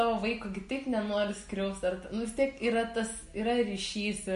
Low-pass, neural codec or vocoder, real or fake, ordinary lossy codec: 14.4 kHz; none; real; MP3, 48 kbps